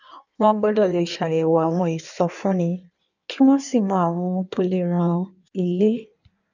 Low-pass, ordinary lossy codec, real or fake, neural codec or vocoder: 7.2 kHz; none; fake; codec, 16 kHz in and 24 kHz out, 1.1 kbps, FireRedTTS-2 codec